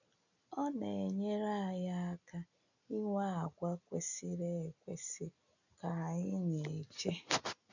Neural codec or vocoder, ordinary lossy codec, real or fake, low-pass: none; none; real; 7.2 kHz